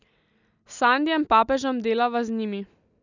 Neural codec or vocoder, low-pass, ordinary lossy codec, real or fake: none; 7.2 kHz; none; real